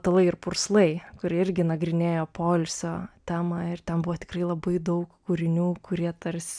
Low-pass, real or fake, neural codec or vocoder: 9.9 kHz; real; none